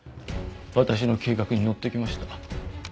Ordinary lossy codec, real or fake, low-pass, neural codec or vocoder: none; real; none; none